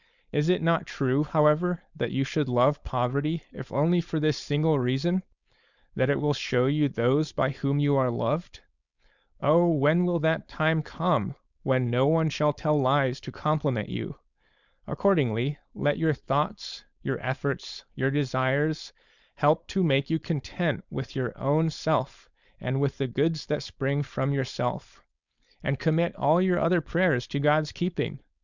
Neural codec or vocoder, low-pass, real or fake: codec, 16 kHz, 4.8 kbps, FACodec; 7.2 kHz; fake